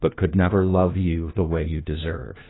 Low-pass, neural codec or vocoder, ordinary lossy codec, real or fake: 7.2 kHz; codec, 16 kHz, 1 kbps, FunCodec, trained on LibriTTS, 50 frames a second; AAC, 16 kbps; fake